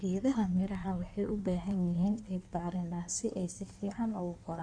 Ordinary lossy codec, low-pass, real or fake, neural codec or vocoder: none; 9.9 kHz; fake; codec, 16 kHz in and 24 kHz out, 1.1 kbps, FireRedTTS-2 codec